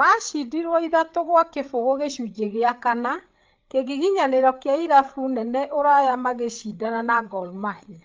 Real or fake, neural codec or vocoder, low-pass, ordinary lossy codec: fake; codec, 16 kHz, 8 kbps, FreqCodec, larger model; 7.2 kHz; Opus, 24 kbps